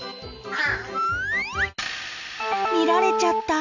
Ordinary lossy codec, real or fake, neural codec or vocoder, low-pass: none; real; none; 7.2 kHz